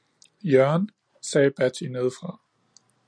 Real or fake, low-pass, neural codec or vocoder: real; 9.9 kHz; none